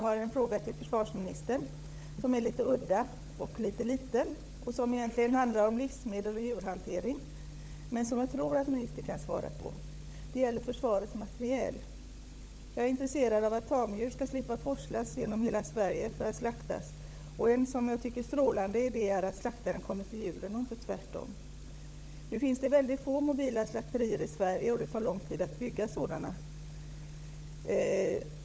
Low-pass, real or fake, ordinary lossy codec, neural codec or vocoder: none; fake; none; codec, 16 kHz, 16 kbps, FunCodec, trained on LibriTTS, 50 frames a second